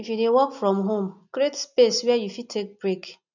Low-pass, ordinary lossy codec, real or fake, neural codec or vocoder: 7.2 kHz; none; real; none